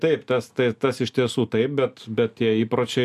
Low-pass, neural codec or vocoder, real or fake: 14.4 kHz; none; real